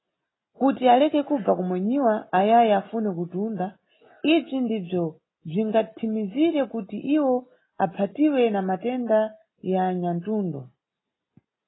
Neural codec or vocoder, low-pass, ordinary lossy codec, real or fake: none; 7.2 kHz; AAC, 16 kbps; real